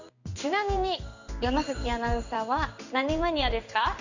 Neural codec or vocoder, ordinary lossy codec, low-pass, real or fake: codec, 16 kHz in and 24 kHz out, 1 kbps, XY-Tokenizer; none; 7.2 kHz; fake